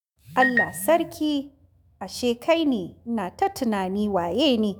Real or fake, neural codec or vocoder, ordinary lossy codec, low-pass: fake; autoencoder, 48 kHz, 128 numbers a frame, DAC-VAE, trained on Japanese speech; none; none